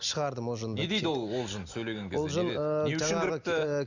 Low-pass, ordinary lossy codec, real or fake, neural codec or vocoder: 7.2 kHz; none; real; none